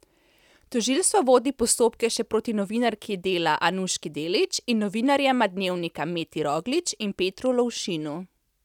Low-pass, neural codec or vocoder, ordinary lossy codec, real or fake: 19.8 kHz; none; none; real